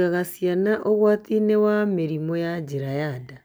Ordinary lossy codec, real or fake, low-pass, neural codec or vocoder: none; real; none; none